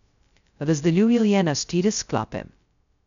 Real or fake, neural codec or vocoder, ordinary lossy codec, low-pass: fake; codec, 16 kHz, 0.2 kbps, FocalCodec; none; 7.2 kHz